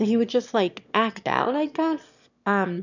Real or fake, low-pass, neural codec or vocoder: fake; 7.2 kHz; autoencoder, 22.05 kHz, a latent of 192 numbers a frame, VITS, trained on one speaker